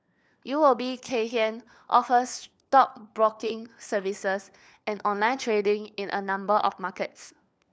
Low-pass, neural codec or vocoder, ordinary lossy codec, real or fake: none; codec, 16 kHz, 4 kbps, FunCodec, trained on LibriTTS, 50 frames a second; none; fake